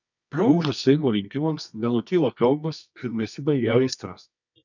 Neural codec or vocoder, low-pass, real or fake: codec, 24 kHz, 0.9 kbps, WavTokenizer, medium music audio release; 7.2 kHz; fake